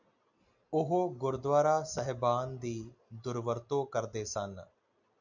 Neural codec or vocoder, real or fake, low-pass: none; real; 7.2 kHz